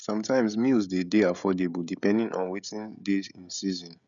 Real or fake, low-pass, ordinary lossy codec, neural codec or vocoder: fake; 7.2 kHz; none; codec, 16 kHz, 16 kbps, FreqCodec, smaller model